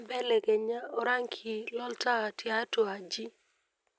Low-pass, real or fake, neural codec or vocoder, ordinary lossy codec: none; real; none; none